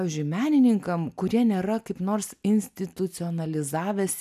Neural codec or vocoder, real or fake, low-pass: none; real; 14.4 kHz